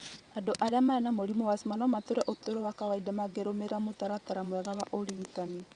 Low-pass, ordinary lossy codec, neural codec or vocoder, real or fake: 9.9 kHz; Opus, 64 kbps; vocoder, 22.05 kHz, 80 mel bands, WaveNeXt; fake